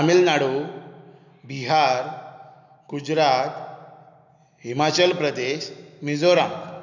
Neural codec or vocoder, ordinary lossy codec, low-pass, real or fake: none; none; 7.2 kHz; real